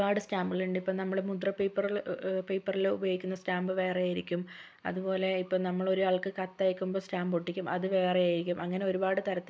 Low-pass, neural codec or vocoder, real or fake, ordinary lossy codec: none; none; real; none